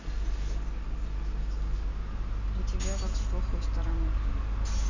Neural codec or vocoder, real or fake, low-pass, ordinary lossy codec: none; real; 7.2 kHz; none